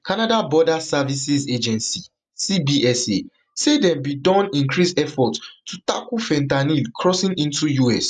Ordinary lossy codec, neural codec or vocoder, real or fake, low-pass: none; none; real; 10.8 kHz